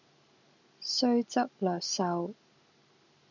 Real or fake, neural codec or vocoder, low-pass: real; none; 7.2 kHz